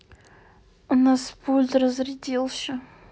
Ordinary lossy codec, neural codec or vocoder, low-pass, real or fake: none; none; none; real